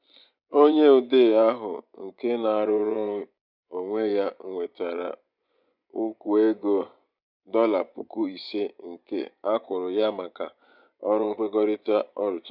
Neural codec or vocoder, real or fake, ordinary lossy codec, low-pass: vocoder, 24 kHz, 100 mel bands, Vocos; fake; none; 5.4 kHz